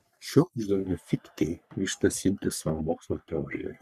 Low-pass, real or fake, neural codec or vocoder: 14.4 kHz; fake; codec, 44.1 kHz, 3.4 kbps, Pupu-Codec